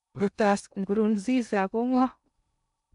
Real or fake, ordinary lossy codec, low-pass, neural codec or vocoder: fake; none; 10.8 kHz; codec, 16 kHz in and 24 kHz out, 0.6 kbps, FocalCodec, streaming, 2048 codes